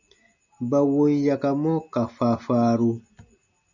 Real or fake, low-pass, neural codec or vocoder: real; 7.2 kHz; none